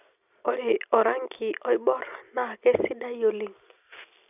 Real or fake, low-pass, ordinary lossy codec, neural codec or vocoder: real; 3.6 kHz; none; none